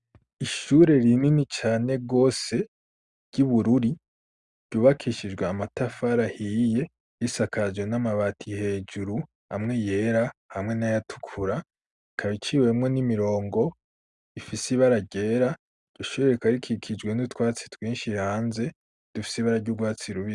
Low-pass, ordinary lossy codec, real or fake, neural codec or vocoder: 10.8 kHz; Opus, 64 kbps; real; none